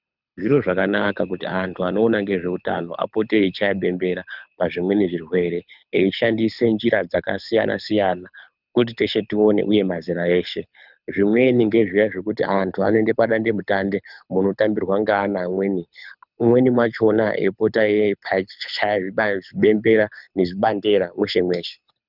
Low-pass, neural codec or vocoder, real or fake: 5.4 kHz; codec, 24 kHz, 6 kbps, HILCodec; fake